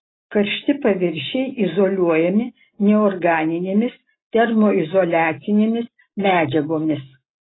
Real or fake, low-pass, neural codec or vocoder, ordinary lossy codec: real; 7.2 kHz; none; AAC, 16 kbps